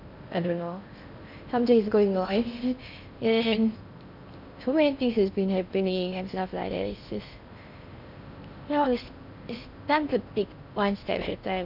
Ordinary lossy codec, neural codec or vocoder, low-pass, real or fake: none; codec, 16 kHz in and 24 kHz out, 0.6 kbps, FocalCodec, streaming, 4096 codes; 5.4 kHz; fake